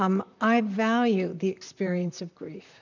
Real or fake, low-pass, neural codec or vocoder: fake; 7.2 kHz; vocoder, 44.1 kHz, 128 mel bands, Pupu-Vocoder